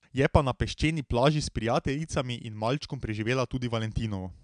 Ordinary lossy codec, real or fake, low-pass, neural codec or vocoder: MP3, 96 kbps; real; 9.9 kHz; none